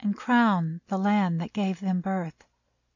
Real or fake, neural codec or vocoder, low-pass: real; none; 7.2 kHz